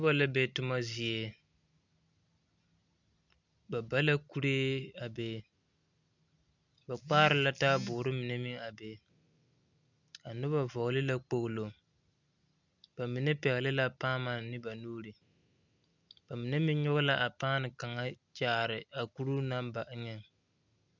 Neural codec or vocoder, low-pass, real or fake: none; 7.2 kHz; real